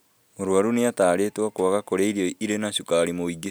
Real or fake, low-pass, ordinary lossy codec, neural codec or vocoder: real; none; none; none